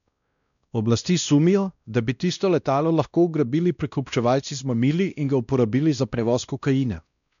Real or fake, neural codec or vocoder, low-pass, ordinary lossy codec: fake; codec, 16 kHz, 1 kbps, X-Codec, WavLM features, trained on Multilingual LibriSpeech; 7.2 kHz; none